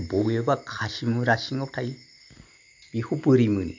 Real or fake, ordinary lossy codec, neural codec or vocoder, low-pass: real; MP3, 48 kbps; none; 7.2 kHz